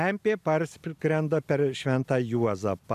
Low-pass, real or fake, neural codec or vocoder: 14.4 kHz; real; none